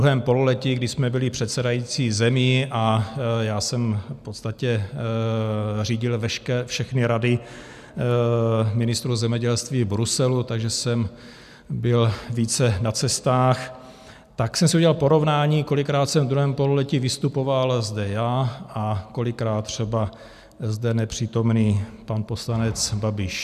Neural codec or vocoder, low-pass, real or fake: none; 14.4 kHz; real